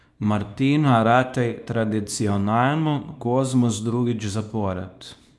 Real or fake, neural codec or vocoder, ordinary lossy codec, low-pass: fake; codec, 24 kHz, 0.9 kbps, WavTokenizer, small release; none; none